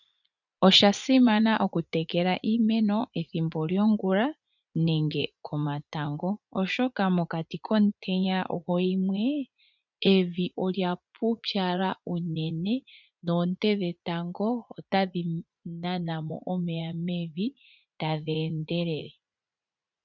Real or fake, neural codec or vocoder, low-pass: fake; vocoder, 44.1 kHz, 80 mel bands, Vocos; 7.2 kHz